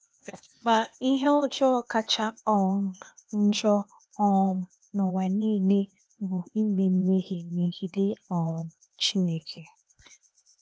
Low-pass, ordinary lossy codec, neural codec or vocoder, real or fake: none; none; codec, 16 kHz, 0.8 kbps, ZipCodec; fake